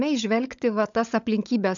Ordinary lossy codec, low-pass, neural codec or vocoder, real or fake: MP3, 96 kbps; 7.2 kHz; codec, 16 kHz, 16 kbps, FunCodec, trained on LibriTTS, 50 frames a second; fake